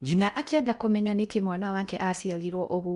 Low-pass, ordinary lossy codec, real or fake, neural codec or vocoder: 10.8 kHz; none; fake; codec, 16 kHz in and 24 kHz out, 0.8 kbps, FocalCodec, streaming, 65536 codes